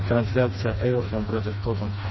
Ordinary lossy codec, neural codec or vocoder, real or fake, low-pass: MP3, 24 kbps; codec, 16 kHz, 2 kbps, FreqCodec, smaller model; fake; 7.2 kHz